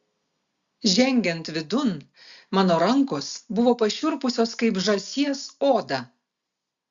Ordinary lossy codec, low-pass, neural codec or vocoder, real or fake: Opus, 64 kbps; 7.2 kHz; none; real